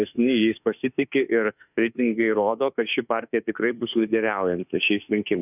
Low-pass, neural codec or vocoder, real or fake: 3.6 kHz; autoencoder, 48 kHz, 32 numbers a frame, DAC-VAE, trained on Japanese speech; fake